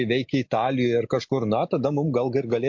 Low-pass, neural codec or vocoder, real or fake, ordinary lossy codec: 7.2 kHz; none; real; MP3, 48 kbps